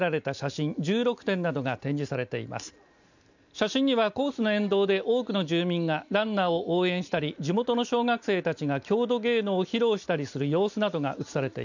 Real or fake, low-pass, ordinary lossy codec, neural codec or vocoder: real; 7.2 kHz; none; none